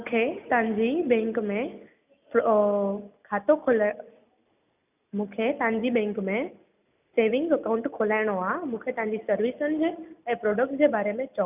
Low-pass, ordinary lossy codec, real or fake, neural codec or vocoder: 3.6 kHz; none; real; none